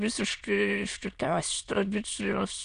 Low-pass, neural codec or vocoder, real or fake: 9.9 kHz; autoencoder, 22.05 kHz, a latent of 192 numbers a frame, VITS, trained on many speakers; fake